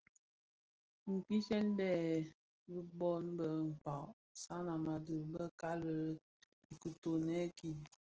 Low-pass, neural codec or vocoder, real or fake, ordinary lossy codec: 7.2 kHz; none; real; Opus, 16 kbps